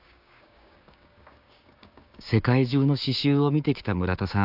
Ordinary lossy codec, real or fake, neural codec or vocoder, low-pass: AAC, 48 kbps; fake; codec, 16 kHz, 6 kbps, DAC; 5.4 kHz